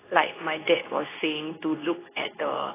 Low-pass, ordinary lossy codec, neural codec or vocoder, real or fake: 3.6 kHz; AAC, 16 kbps; codec, 24 kHz, 0.9 kbps, WavTokenizer, medium speech release version 2; fake